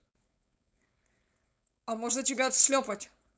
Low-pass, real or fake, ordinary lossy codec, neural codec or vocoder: none; fake; none; codec, 16 kHz, 4.8 kbps, FACodec